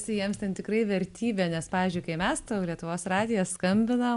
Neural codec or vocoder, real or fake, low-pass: none; real; 10.8 kHz